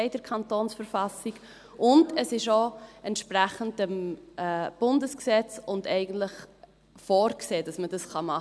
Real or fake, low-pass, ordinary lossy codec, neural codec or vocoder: real; none; none; none